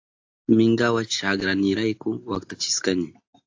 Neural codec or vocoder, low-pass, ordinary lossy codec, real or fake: none; 7.2 kHz; AAC, 48 kbps; real